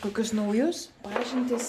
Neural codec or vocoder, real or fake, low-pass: none; real; 14.4 kHz